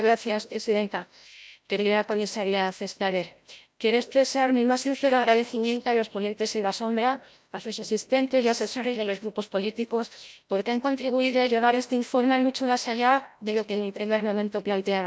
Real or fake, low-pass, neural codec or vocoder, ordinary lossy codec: fake; none; codec, 16 kHz, 0.5 kbps, FreqCodec, larger model; none